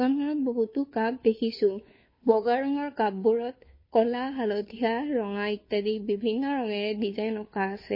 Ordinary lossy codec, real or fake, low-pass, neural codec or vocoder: MP3, 24 kbps; fake; 5.4 kHz; codec, 16 kHz, 2 kbps, FunCodec, trained on Chinese and English, 25 frames a second